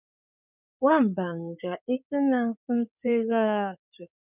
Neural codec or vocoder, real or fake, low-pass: codec, 16 kHz in and 24 kHz out, 2.2 kbps, FireRedTTS-2 codec; fake; 3.6 kHz